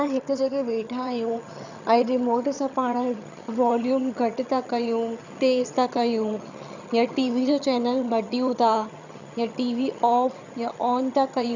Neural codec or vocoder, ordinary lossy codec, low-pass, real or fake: vocoder, 22.05 kHz, 80 mel bands, HiFi-GAN; none; 7.2 kHz; fake